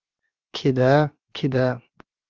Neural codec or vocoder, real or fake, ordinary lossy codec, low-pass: codec, 16 kHz, 0.7 kbps, FocalCodec; fake; Opus, 32 kbps; 7.2 kHz